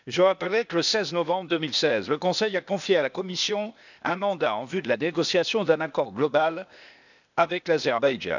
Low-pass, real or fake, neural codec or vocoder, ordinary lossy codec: 7.2 kHz; fake; codec, 16 kHz, 0.8 kbps, ZipCodec; none